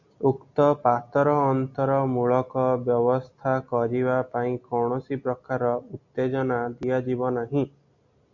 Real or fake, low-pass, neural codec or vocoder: real; 7.2 kHz; none